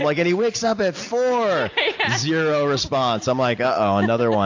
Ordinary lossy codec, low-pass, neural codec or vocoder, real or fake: AAC, 48 kbps; 7.2 kHz; none; real